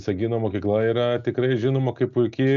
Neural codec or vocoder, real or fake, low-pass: none; real; 7.2 kHz